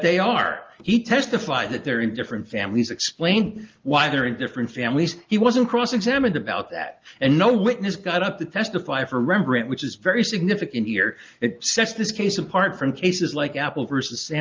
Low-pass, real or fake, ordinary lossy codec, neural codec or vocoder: 7.2 kHz; real; Opus, 24 kbps; none